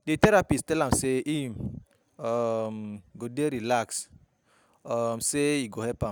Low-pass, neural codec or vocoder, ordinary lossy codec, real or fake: none; none; none; real